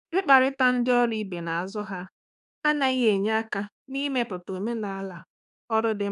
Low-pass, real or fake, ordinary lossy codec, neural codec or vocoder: 10.8 kHz; fake; none; codec, 24 kHz, 1.2 kbps, DualCodec